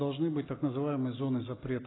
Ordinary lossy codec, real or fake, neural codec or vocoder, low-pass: AAC, 16 kbps; real; none; 7.2 kHz